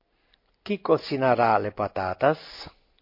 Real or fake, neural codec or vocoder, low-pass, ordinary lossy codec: real; none; 5.4 kHz; MP3, 32 kbps